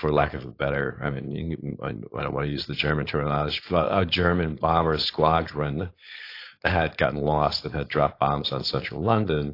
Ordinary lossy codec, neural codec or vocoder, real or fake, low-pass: AAC, 32 kbps; codec, 16 kHz, 4.8 kbps, FACodec; fake; 5.4 kHz